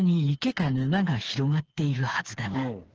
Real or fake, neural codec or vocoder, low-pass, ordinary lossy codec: fake; codec, 16 kHz, 4 kbps, FreqCodec, smaller model; 7.2 kHz; Opus, 16 kbps